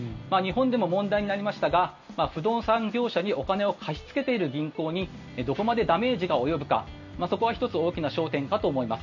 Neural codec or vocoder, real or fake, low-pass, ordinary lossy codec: none; real; 7.2 kHz; none